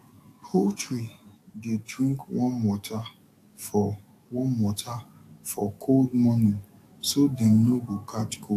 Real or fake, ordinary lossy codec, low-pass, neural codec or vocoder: fake; AAC, 64 kbps; 14.4 kHz; codec, 44.1 kHz, 7.8 kbps, DAC